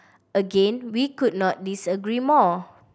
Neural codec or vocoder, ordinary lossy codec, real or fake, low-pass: none; none; real; none